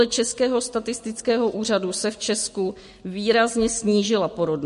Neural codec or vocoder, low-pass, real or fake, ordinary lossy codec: none; 14.4 kHz; real; MP3, 48 kbps